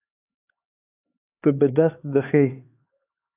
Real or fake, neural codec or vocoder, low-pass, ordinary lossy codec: fake; codec, 16 kHz, 4 kbps, X-Codec, HuBERT features, trained on LibriSpeech; 3.6 kHz; AAC, 24 kbps